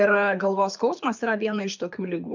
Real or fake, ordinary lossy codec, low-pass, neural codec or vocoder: fake; MP3, 64 kbps; 7.2 kHz; codec, 24 kHz, 6 kbps, HILCodec